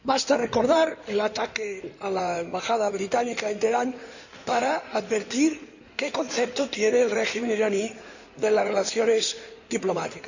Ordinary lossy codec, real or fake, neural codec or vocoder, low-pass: none; fake; codec, 16 kHz in and 24 kHz out, 2.2 kbps, FireRedTTS-2 codec; 7.2 kHz